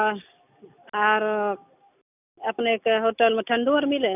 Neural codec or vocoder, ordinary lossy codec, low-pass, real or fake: none; none; 3.6 kHz; real